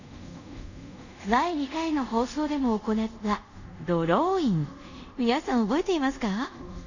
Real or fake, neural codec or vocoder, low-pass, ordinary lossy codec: fake; codec, 24 kHz, 0.5 kbps, DualCodec; 7.2 kHz; none